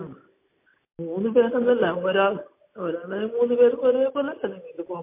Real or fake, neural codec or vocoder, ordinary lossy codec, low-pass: real; none; MP3, 24 kbps; 3.6 kHz